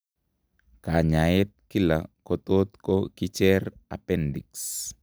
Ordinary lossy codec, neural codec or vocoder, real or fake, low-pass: none; none; real; none